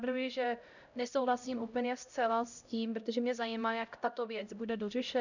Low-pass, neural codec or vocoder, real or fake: 7.2 kHz; codec, 16 kHz, 0.5 kbps, X-Codec, HuBERT features, trained on LibriSpeech; fake